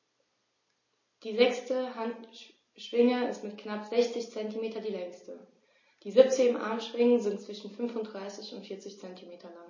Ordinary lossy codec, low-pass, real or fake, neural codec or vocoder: MP3, 32 kbps; 7.2 kHz; real; none